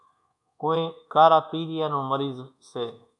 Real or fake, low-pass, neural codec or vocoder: fake; 10.8 kHz; codec, 24 kHz, 1.2 kbps, DualCodec